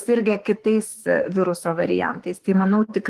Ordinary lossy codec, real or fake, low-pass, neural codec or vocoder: Opus, 24 kbps; fake; 14.4 kHz; autoencoder, 48 kHz, 32 numbers a frame, DAC-VAE, trained on Japanese speech